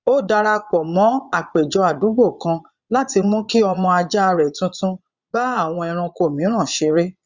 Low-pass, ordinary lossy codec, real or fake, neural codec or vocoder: 7.2 kHz; Opus, 64 kbps; fake; vocoder, 22.05 kHz, 80 mel bands, Vocos